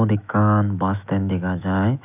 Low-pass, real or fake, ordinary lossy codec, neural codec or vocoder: 3.6 kHz; fake; none; autoencoder, 48 kHz, 128 numbers a frame, DAC-VAE, trained on Japanese speech